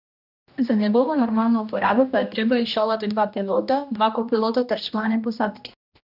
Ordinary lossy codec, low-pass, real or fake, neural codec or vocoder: none; 5.4 kHz; fake; codec, 16 kHz, 1 kbps, X-Codec, HuBERT features, trained on general audio